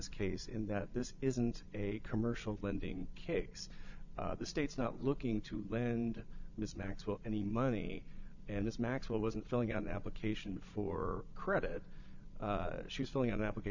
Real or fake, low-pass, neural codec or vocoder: fake; 7.2 kHz; vocoder, 22.05 kHz, 80 mel bands, Vocos